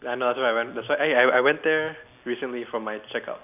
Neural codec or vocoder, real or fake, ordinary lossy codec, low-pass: none; real; none; 3.6 kHz